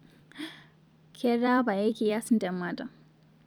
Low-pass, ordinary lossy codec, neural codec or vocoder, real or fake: 19.8 kHz; none; vocoder, 44.1 kHz, 128 mel bands every 256 samples, BigVGAN v2; fake